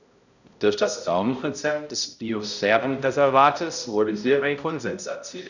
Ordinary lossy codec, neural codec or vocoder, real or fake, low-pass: none; codec, 16 kHz, 0.5 kbps, X-Codec, HuBERT features, trained on balanced general audio; fake; 7.2 kHz